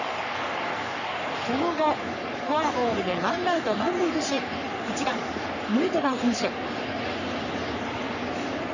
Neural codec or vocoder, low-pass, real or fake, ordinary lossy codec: codec, 44.1 kHz, 3.4 kbps, Pupu-Codec; 7.2 kHz; fake; none